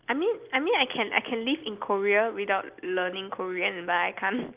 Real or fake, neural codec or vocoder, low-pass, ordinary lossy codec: real; none; 3.6 kHz; Opus, 32 kbps